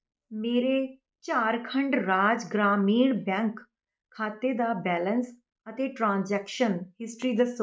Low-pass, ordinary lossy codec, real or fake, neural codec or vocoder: none; none; real; none